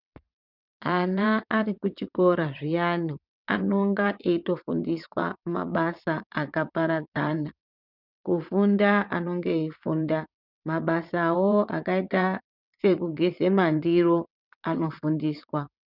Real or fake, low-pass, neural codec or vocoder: fake; 5.4 kHz; vocoder, 22.05 kHz, 80 mel bands, WaveNeXt